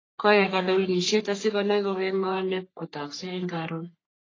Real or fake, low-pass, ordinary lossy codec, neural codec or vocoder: fake; 7.2 kHz; AAC, 32 kbps; codec, 44.1 kHz, 3.4 kbps, Pupu-Codec